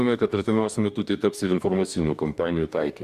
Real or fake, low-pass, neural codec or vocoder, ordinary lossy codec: fake; 14.4 kHz; codec, 44.1 kHz, 2.6 kbps, DAC; MP3, 96 kbps